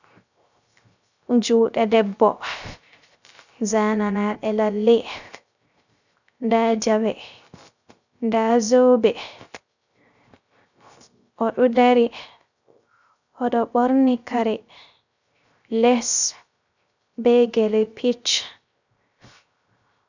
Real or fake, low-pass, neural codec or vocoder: fake; 7.2 kHz; codec, 16 kHz, 0.3 kbps, FocalCodec